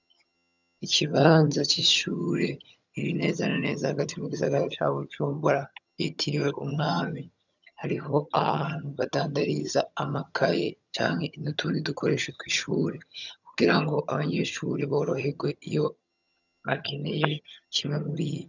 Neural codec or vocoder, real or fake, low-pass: vocoder, 22.05 kHz, 80 mel bands, HiFi-GAN; fake; 7.2 kHz